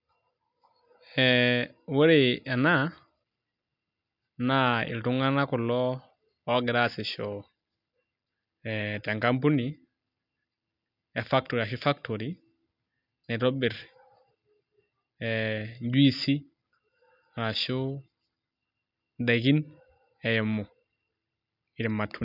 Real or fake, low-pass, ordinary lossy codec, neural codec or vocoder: real; 5.4 kHz; none; none